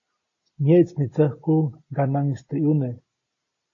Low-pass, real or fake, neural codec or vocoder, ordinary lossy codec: 7.2 kHz; real; none; AAC, 32 kbps